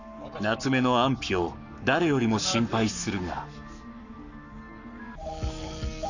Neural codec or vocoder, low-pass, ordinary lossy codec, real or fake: codec, 44.1 kHz, 7.8 kbps, Pupu-Codec; 7.2 kHz; none; fake